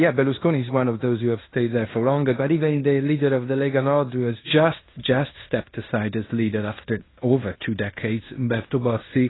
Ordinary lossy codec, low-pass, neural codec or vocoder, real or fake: AAC, 16 kbps; 7.2 kHz; codec, 16 kHz in and 24 kHz out, 0.9 kbps, LongCat-Audio-Codec, fine tuned four codebook decoder; fake